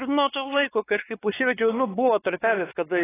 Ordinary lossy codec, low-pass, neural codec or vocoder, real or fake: AAC, 16 kbps; 3.6 kHz; codec, 16 kHz, 4 kbps, X-Codec, WavLM features, trained on Multilingual LibriSpeech; fake